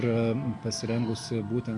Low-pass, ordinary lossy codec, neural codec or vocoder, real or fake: 10.8 kHz; AAC, 64 kbps; none; real